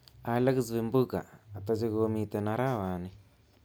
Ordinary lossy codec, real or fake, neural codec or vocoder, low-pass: none; real; none; none